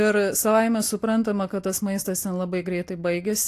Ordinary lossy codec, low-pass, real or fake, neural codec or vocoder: AAC, 64 kbps; 14.4 kHz; real; none